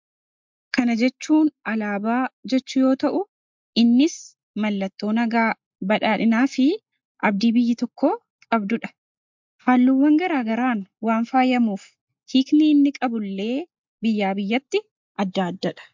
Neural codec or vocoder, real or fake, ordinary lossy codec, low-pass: none; real; MP3, 64 kbps; 7.2 kHz